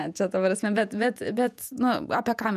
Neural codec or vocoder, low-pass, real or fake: vocoder, 48 kHz, 128 mel bands, Vocos; 14.4 kHz; fake